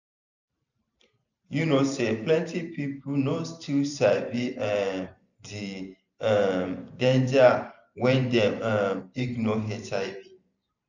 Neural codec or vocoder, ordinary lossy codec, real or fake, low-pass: vocoder, 44.1 kHz, 128 mel bands every 512 samples, BigVGAN v2; none; fake; 7.2 kHz